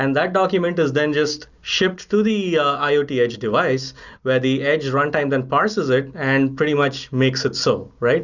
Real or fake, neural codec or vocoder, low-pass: real; none; 7.2 kHz